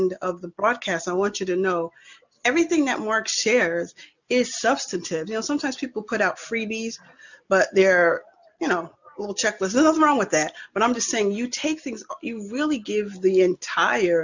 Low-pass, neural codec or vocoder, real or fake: 7.2 kHz; none; real